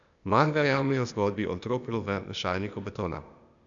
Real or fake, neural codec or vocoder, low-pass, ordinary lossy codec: fake; codec, 16 kHz, 0.8 kbps, ZipCodec; 7.2 kHz; none